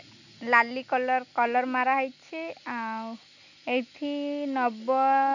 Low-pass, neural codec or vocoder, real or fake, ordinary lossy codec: 7.2 kHz; none; real; none